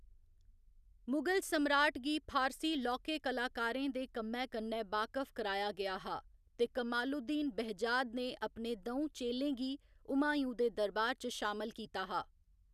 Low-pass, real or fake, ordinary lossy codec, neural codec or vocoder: 14.4 kHz; real; none; none